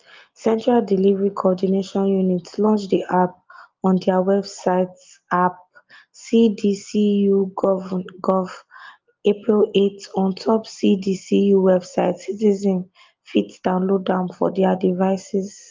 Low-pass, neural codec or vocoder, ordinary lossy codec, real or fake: 7.2 kHz; none; Opus, 24 kbps; real